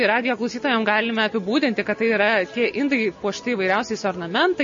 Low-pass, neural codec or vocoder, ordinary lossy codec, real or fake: 7.2 kHz; none; MP3, 32 kbps; real